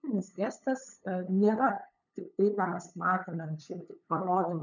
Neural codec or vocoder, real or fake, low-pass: codec, 16 kHz, 8 kbps, FunCodec, trained on LibriTTS, 25 frames a second; fake; 7.2 kHz